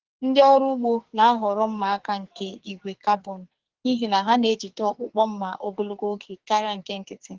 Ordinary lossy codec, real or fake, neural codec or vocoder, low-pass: Opus, 16 kbps; fake; codec, 44.1 kHz, 2.6 kbps, SNAC; 7.2 kHz